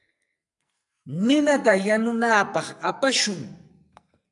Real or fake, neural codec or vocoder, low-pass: fake; codec, 44.1 kHz, 2.6 kbps, SNAC; 10.8 kHz